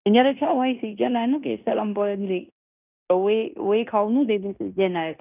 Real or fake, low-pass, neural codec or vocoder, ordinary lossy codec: fake; 3.6 kHz; codec, 16 kHz in and 24 kHz out, 0.9 kbps, LongCat-Audio-Codec, fine tuned four codebook decoder; none